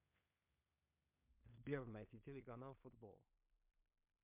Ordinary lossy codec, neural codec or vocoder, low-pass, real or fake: MP3, 32 kbps; codec, 16 kHz in and 24 kHz out, 0.4 kbps, LongCat-Audio-Codec, two codebook decoder; 3.6 kHz; fake